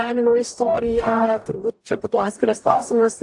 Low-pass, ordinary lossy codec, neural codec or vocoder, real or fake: 10.8 kHz; AAC, 64 kbps; codec, 44.1 kHz, 0.9 kbps, DAC; fake